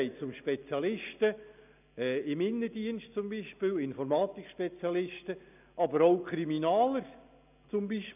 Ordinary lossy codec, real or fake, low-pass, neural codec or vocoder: none; real; 3.6 kHz; none